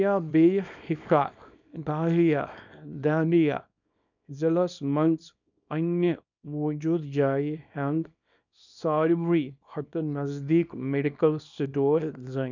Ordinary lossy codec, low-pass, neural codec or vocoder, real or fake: none; 7.2 kHz; codec, 24 kHz, 0.9 kbps, WavTokenizer, small release; fake